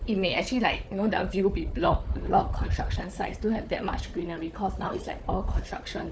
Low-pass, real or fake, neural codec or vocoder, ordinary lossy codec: none; fake; codec, 16 kHz, 4 kbps, FunCodec, trained on Chinese and English, 50 frames a second; none